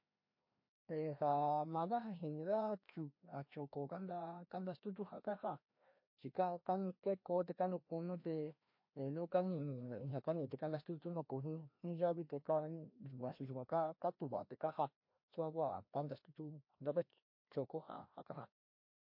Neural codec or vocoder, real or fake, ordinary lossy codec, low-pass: codec, 16 kHz, 1 kbps, FreqCodec, larger model; fake; MP3, 32 kbps; 5.4 kHz